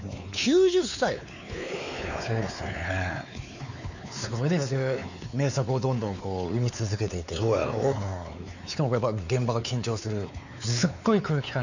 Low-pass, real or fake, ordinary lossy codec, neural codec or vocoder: 7.2 kHz; fake; none; codec, 16 kHz, 4 kbps, X-Codec, WavLM features, trained on Multilingual LibriSpeech